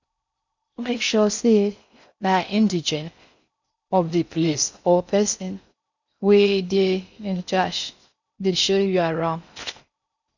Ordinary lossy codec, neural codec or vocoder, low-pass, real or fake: none; codec, 16 kHz in and 24 kHz out, 0.6 kbps, FocalCodec, streaming, 4096 codes; 7.2 kHz; fake